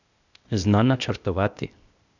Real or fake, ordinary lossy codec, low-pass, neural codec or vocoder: fake; none; 7.2 kHz; codec, 16 kHz, 0.8 kbps, ZipCodec